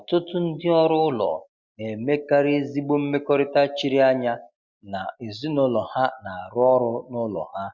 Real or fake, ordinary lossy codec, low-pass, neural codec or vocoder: real; Opus, 64 kbps; 7.2 kHz; none